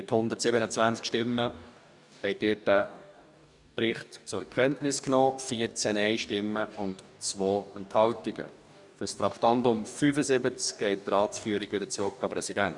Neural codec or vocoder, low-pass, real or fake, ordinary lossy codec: codec, 44.1 kHz, 2.6 kbps, DAC; 10.8 kHz; fake; none